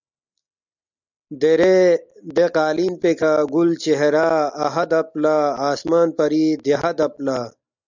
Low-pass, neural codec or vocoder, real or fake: 7.2 kHz; none; real